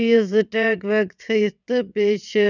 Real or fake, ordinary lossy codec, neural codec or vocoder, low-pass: fake; none; vocoder, 22.05 kHz, 80 mel bands, WaveNeXt; 7.2 kHz